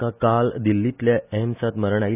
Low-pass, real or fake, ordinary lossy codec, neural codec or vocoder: 3.6 kHz; real; none; none